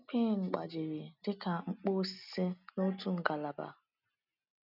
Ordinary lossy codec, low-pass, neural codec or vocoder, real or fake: none; 5.4 kHz; none; real